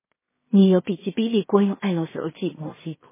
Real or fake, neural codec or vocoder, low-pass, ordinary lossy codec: fake; codec, 16 kHz in and 24 kHz out, 0.4 kbps, LongCat-Audio-Codec, two codebook decoder; 3.6 kHz; MP3, 16 kbps